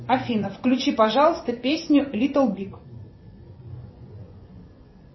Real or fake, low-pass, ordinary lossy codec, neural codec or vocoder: fake; 7.2 kHz; MP3, 24 kbps; vocoder, 24 kHz, 100 mel bands, Vocos